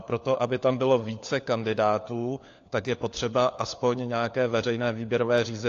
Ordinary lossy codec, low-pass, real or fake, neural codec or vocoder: AAC, 48 kbps; 7.2 kHz; fake; codec, 16 kHz, 4 kbps, FunCodec, trained on LibriTTS, 50 frames a second